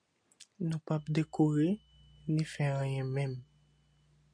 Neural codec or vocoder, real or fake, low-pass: none; real; 9.9 kHz